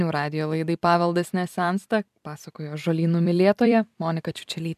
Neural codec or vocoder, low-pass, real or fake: vocoder, 44.1 kHz, 128 mel bands every 256 samples, BigVGAN v2; 14.4 kHz; fake